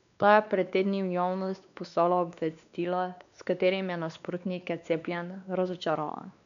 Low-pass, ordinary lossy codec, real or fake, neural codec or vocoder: 7.2 kHz; none; fake; codec, 16 kHz, 2 kbps, X-Codec, WavLM features, trained on Multilingual LibriSpeech